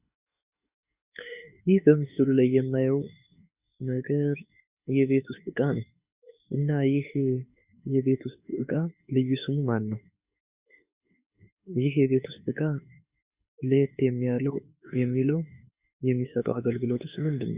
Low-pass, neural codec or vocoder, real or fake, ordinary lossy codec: 3.6 kHz; autoencoder, 48 kHz, 32 numbers a frame, DAC-VAE, trained on Japanese speech; fake; Opus, 64 kbps